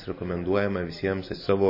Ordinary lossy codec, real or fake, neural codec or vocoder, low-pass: MP3, 24 kbps; real; none; 5.4 kHz